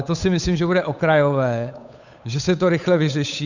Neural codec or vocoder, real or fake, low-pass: codec, 16 kHz, 8 kbps, FunCodec, trained on Chinese and English, 25 frames a second; fake; 7.2 kHz